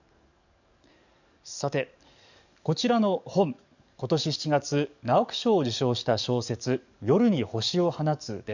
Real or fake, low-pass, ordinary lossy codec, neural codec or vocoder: fake; 7.2 kHz; none; codec, 44.1 kHz, 7.8 kbps, DAC